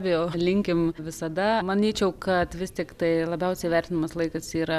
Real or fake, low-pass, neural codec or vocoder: real; 14.4 kHz; none